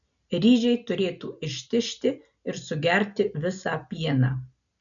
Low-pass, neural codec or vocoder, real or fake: 7.2 kHz; none; real